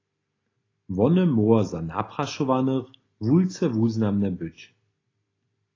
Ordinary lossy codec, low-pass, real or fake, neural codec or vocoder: AAC, 32 kbps; 7.2 kHz; real; none